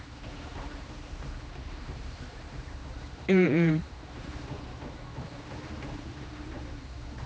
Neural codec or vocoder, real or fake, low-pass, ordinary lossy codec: codec, 16 kHz, 2 kbps, X-Codec, HuBERT features, trained on general audio; fake; none; none